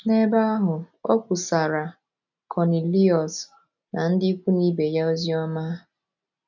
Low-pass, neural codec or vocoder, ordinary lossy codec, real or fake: 7.2 kHz; none; none; real